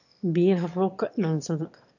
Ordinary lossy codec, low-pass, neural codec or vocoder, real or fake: none; 7.2 kHz; autoencoder, 22.05 kHz, a latent of 192 numbers a frame, VITS, trained on one speaker; fake